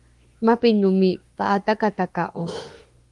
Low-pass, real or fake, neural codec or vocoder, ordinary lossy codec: 10.8 kHz; fake; autoencoder, 48 kHz, 32 numbers a frame, DAC-VAE, trained on Japanese speech; Opus, 24 kbps